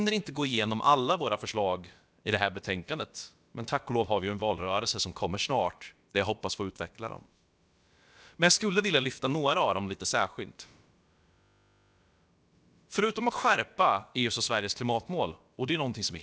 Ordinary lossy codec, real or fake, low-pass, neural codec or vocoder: none; fake; none; codec, 16 kHz, about 1 kbps, DyCAST, with the encoder's durations